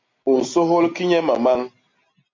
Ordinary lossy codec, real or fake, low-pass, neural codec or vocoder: MP3, 64 kbps; real; 7.2 kHz; none